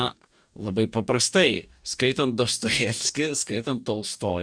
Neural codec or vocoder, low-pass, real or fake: codec, 44.1 kHz, 2.6 kbps, DAC; 9.9 kHz; fake